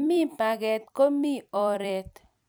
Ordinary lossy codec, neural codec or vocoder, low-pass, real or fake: none; vocoder, 44.1 kHz, 128 mel bands every 256 samples, BigVGAN v2; none; fake